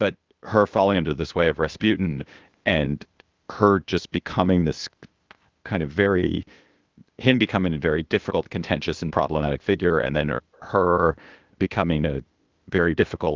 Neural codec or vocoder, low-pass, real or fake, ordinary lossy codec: codec, 16 kHz, 0.8 kbps, ZipCodec; 7.2 kHz; fake; Opus, 24 kbps